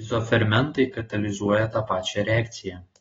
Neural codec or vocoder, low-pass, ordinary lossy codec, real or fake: none; 7.2 kHz; AAC, 24 kbps; real